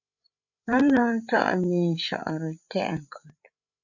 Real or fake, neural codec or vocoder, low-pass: fake; codec, 16 kHz, 8 kbps, FreqCodec, larger model; 7.2 kHz